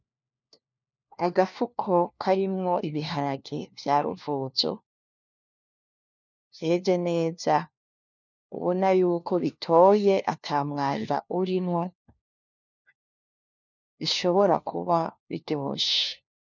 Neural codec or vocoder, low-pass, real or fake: codec, 16 kHz, 1 kbps, FunCodec, trained on LibriTTS, 50 frames a second; 7.2 kHz; fake